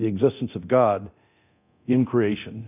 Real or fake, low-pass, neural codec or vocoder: fake; 3.6 kHz; codec, 24 kHz, 0.9 kbps, DualCodec